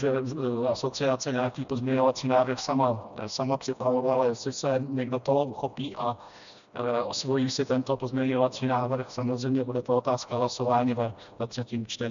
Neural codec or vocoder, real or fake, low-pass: codec, 16 kHz, 1 kbps, FreqCodec, smaller model; fake; 7.2 kHz